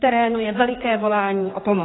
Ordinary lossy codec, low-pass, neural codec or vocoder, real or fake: AAC, 16 kbps; 7.2 kHz; codec, 16 kHz, 4 kbps, X-Codec, HuBERT features, trained on general audio; fake